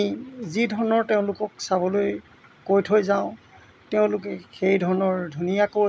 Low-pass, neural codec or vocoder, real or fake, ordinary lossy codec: none; none; real; none